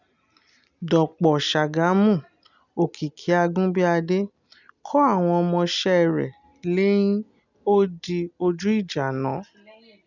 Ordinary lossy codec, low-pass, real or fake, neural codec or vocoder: none; 7.2 kHz; real; none